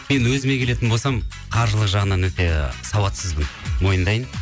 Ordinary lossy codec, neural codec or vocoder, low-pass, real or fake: none; none; none; real